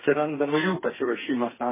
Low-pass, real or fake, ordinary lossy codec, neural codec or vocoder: 3.6 kHz; fake; MP3, 16 kbps; codec, 32 kHz, 1.9 kbps, SNAC